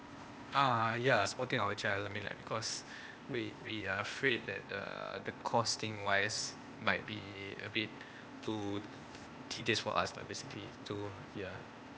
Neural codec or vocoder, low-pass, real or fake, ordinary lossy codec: codec, 16 kHz, 0.8 kbps, ZipCodec; none; fake; none